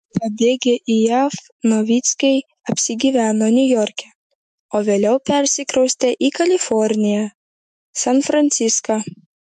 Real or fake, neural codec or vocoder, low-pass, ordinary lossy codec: fake; autoencoder, 48 kHz, 128 numbers a frame, DAC-VAE, trained on Japanese speech; 14.4 kHz; MP3, 64 kbps